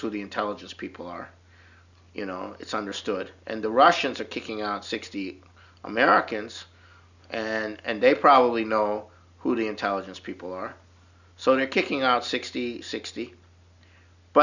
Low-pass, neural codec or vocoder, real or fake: 7.2 kHz; none; real